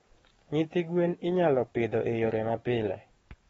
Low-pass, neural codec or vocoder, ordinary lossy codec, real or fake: 19.8 kHz; codec, 44.1 kHz, 7.8 kbps, DAC; AAC, 24 kbps; fake